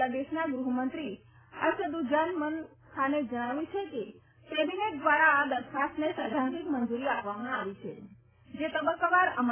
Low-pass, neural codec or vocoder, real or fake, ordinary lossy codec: 3.6 kHz; none; real; AAC, 16 kbps